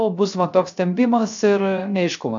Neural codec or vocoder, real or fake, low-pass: codec, 16 kHz, 0.3 kbps, FocalCodec; fake; 7.2 kHz